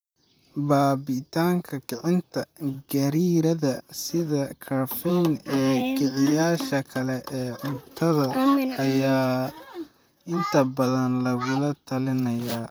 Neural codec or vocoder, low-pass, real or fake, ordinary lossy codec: vocoder, 44.1 kHz, 128 mel bands, Pupu-Vocoder; none; fake; none